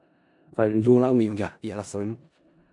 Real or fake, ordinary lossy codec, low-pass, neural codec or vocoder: fake; MP3, 96 kbps; 10.8 kHz; codec, 16 kHz in and 24 kHz out, 0.4 kbps, LongCat-Audio-Codec, four codebook decoder